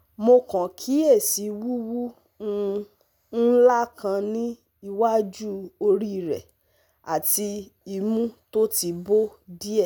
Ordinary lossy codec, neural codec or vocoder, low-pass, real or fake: none; none; none; real